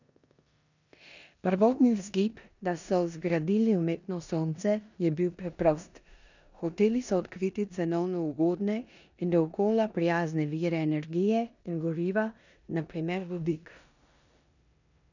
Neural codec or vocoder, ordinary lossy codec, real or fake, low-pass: codec, 16 kHz in and 24 kHz out, 0.9 kbps, LongCat-Audio-Codec, four codebook decoder; none; fake; 7.2 kHz